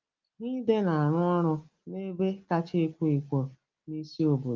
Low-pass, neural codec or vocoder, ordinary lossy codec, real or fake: 7.2 kHz; none; Opus, 32 kbps; real